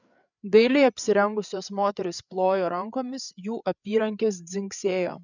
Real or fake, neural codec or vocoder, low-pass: fake; codec, 16 kHz, 8 kbps, FreqCodec, larger model; 7.2 kHz